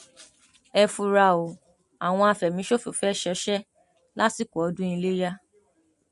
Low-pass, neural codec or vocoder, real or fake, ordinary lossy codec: 14.4 kHz; none; real; MP3, 48 kbps